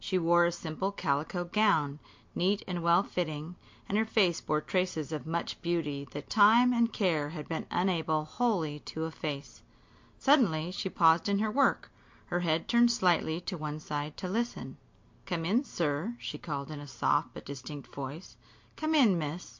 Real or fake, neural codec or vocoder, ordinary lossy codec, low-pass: real; none; MP3, 48 kbps; 7.2 kHz